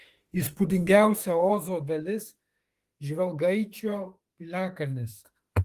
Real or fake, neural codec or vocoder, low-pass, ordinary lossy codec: fake; autoencoder, 48 kHz, 32 numbers a frame, DAC-VAE, trained on Japanese speech; 14.4 kHz; Opus, 24 kbps